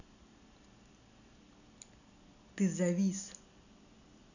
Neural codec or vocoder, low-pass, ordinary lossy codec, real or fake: none; 7.2 kHz; none; real